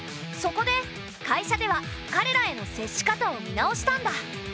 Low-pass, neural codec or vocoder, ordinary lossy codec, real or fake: none; none; none; real